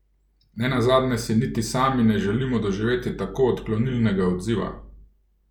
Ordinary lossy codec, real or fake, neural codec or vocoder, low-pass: none; real; none; 19.8 kHz